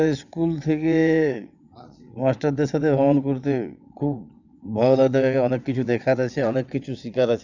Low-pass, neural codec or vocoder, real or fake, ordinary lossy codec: 7.2 kHz; vocoder, 22.05 kHz, 80 mel bands, WaveNeXt; fake; none